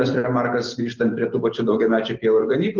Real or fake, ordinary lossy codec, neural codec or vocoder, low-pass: real; Opus, 16 kbps; none; 7.2 kHz